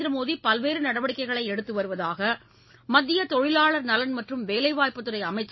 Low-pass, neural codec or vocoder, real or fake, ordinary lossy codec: 7.2 kHz; none; real; MP3, 24 kbps